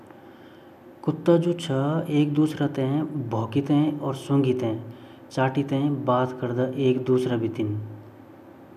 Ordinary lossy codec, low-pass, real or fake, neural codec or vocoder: none; 14.4 kHz; real; none